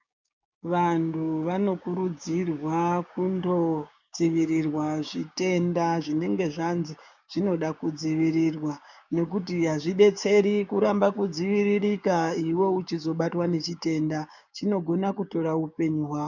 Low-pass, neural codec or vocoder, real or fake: 7.2 kHz; codec, 16 kHz, 6 kbps, DAC; fake